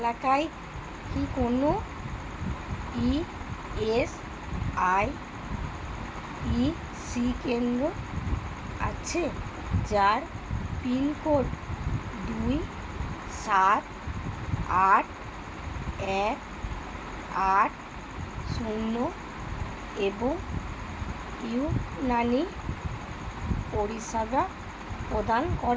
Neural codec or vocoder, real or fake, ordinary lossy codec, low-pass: none; real; none; none